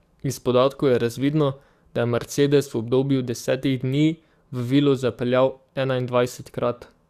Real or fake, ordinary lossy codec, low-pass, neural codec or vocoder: fake; Opus, 64 kbps; 14.4 kHz; codec, 44.1 kHz, 7.8 kbps, DAC